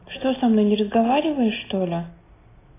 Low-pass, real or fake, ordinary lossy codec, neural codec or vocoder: 3.6 kHz; real; AAC, 16 kbps; none